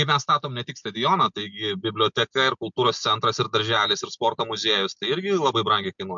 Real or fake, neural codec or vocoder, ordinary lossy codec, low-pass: real; none; MP3, 64 kbps; 7.2 kHz